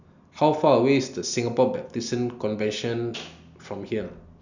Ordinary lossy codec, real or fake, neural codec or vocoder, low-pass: none; real; none; 7.2 kHz